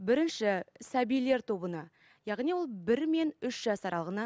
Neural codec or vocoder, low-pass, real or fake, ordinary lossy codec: none; none; real; none